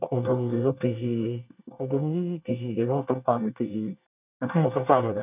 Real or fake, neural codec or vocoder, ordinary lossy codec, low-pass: fake; codec, 24 kHz, 1 kbps, SNAC; none; 3.6 kHz